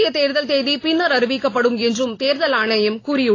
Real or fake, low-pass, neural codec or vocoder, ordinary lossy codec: real; 7.2 kHz; none; AAC, 32 kbps